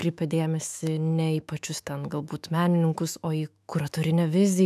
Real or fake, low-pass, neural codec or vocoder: fake; 14.4 kHz; autoencoder, 48 kHz, 128 numbers a frame, DAC-VAE, trained on Japanese speech